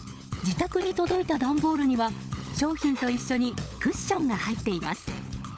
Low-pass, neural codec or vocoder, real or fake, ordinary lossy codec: none; codec, 16 kHz, 16 kbps, FunCodec, trained on LibriTTS, 50 frames a second; fake; none